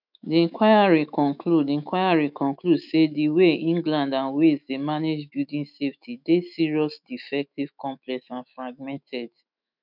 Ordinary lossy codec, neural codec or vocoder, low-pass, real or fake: none; autoencoder, 48 kHz, 128 numbers a frame, DAC-VAE, trained on Japanese speech; 5.4 kHz; fake